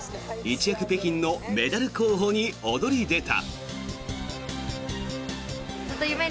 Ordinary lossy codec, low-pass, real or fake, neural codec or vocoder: none; none; real; none